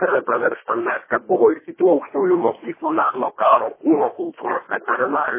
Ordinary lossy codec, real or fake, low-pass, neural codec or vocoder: MP3, 16 kbps; fake; 3.6 kHz; codec, 24 kHz, 1.5 kbps, HILCodec